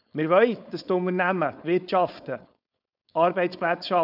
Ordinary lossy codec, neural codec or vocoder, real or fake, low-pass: none; codec, 16 kHz, 4.8 kbps, FACodec; fake; 5.4 kHz